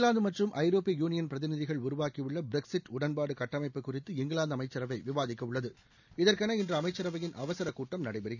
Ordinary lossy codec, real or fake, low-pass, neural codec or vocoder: none; real; 7.2 kHz; none